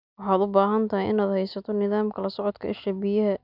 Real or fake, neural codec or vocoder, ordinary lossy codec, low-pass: real; none; none; 5.4 kHz